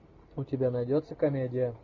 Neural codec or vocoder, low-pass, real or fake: none; 7.2 kHz; real